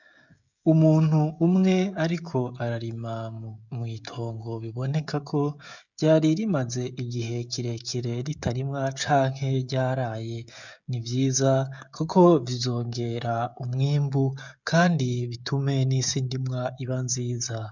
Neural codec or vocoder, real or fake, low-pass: codec, 16 kHz, 16 kbps, FreqCodec, smaller model; fake; 7.2 kHz